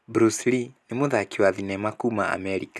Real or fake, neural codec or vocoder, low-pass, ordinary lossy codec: real; none; none; none